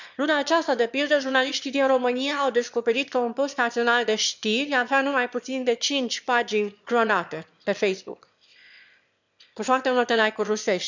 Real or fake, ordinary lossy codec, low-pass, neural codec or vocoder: fake; none; 7.2 kHz; autoencoder, 22.05 kHz, a latent of 192 numbers a frame, VITS, trained on one speaker